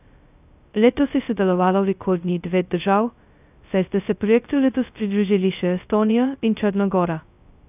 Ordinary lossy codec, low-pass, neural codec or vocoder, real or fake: none; 3.6 kHz; codec, 16 kHz, 0.2 kbps, FocalCodec; fake